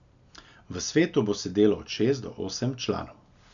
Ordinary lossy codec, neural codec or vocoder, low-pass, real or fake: none; none; 7.2 kHz; real